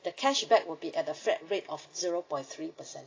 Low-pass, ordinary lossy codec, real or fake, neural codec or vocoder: 7.2 kHz; AAC, 32 kbps; real; none